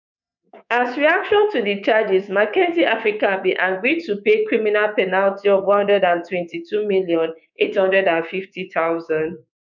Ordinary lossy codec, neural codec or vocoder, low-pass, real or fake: none; codec, 24 kHz, 3.1 kbps, DualCodec; 7.2 kHz; fake